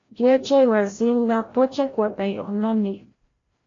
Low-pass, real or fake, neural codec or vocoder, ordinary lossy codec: 7.2 kHz; fake; codec, 16 kHz, 0.5 kbps, FreqCodec, larger model; AAC, 32 kbps